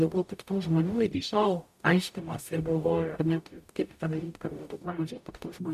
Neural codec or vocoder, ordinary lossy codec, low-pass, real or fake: codec, 44.1 kHz, 0.9 kbps, DAC; MP3, 96 kbps; 14.4 kHz; fake